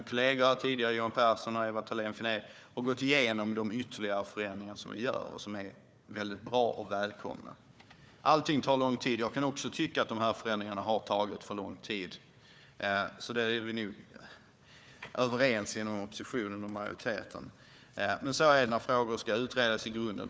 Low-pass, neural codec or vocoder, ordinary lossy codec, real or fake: none; codec, 16 kHz, 4 kbps, FunCodec, trained on Chinese and English, 50 frames a second; none; fake